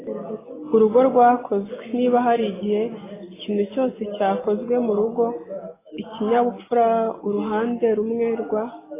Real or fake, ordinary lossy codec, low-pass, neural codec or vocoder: real; AAC, 16 kbps; 3.6 kHz; none